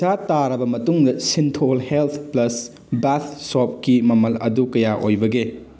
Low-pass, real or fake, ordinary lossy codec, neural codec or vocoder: none; real; none; none